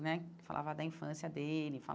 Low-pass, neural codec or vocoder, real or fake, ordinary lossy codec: none; none; real; none